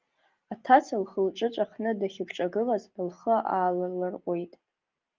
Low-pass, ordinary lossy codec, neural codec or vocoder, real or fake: 7.2 kHz; Opus, 32 kbps; none; real